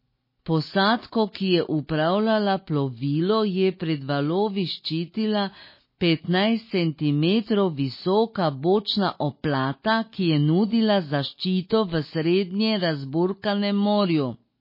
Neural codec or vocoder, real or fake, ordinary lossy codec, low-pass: none; real; MP3, 24 kbps; 5.4 kHz